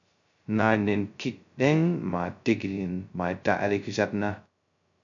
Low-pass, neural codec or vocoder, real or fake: 7.2 kHz; codec, 16 kHz, 0.2 kbps, FocalCodec; fake